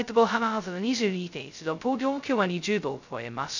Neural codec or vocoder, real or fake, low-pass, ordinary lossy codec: codec, 16 kHz, 0.2 kbps, FocalCodec; fake; 7.2 kHz; none